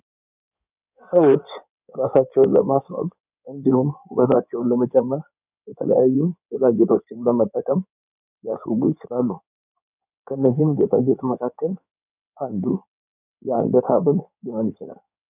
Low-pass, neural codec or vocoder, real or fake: 3.6 kHz; codec, 16 kHz in and 24 kHz out, 2.2 kbps, FireRedTTS-2 codec; fake